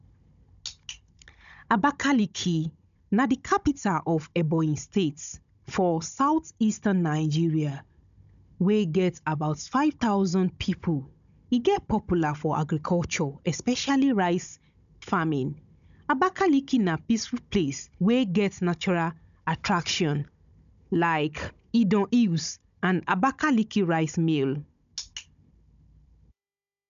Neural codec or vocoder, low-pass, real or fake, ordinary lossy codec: codec, 16 kHz, 16 kbps, FunCodec, trained on Chinese and English, 50 frames a second; 7.2 kHz; fake; MP3, 96 kbps